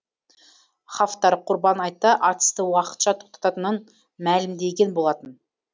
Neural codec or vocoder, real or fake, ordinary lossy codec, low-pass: none; real; none; none